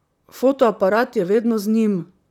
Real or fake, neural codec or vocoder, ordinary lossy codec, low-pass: fake; vocoder, 44.1 kHz, 128 mel bands, Pupu-Vocoder; none; 19.8 kHz